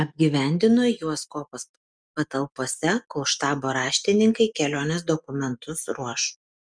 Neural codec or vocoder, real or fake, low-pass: none; real; 9.9 kHz